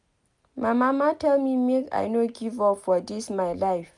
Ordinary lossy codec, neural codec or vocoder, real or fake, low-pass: none; none; real; 10.8 kHz